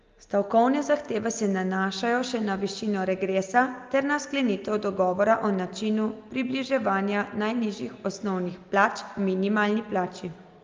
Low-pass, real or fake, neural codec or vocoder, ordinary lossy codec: 7.2 kHz; real; none; Opus, 32 kbps